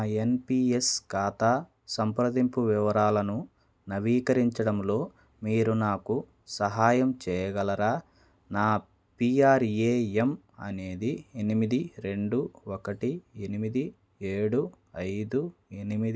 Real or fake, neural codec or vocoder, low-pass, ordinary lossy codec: real; none; none; none